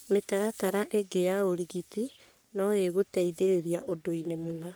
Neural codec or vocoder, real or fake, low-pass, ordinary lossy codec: codec, 44.1 kHz, 3.4 kbps, Pupu-Codec; fake; none; none